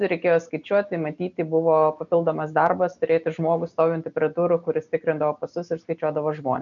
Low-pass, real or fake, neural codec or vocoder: 7.2 kHz; real; none